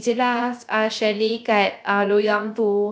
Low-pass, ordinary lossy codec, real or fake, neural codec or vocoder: none; none; fake; codec, 16 kHz, 0.3 kbps, FocalCodec